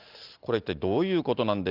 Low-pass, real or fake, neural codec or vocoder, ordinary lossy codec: 5.4 kHz; real; none; Opus, 32 kbps